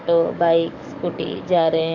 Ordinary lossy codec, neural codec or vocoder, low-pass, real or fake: none; codec, 44.1 kHz, 7.8 kbps, DAC; 7.2 kHz; fake